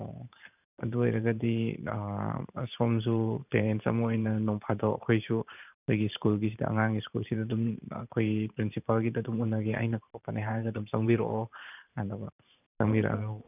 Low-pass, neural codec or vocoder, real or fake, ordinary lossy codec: 3.6 kHz; vocoder, 44.1 kHz, 128 mel bands every 512 samples, BigVGAN v2; fake; none